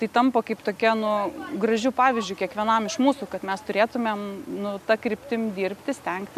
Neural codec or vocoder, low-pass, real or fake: none; 14.4 kHz; real